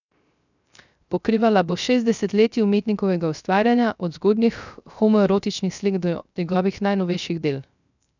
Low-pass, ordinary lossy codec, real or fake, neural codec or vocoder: 7.2 kHz; none; fake; codec, 16 kHz, 0.3 kbps, FocalCodec